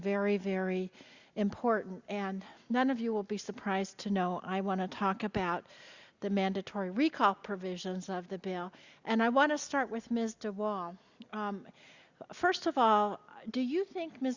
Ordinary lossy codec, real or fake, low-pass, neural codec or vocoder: Opus, 64 kbps; real; 7.2 kHz; none